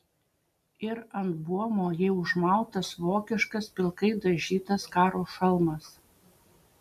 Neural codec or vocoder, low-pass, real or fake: none; 14.4 kHz; real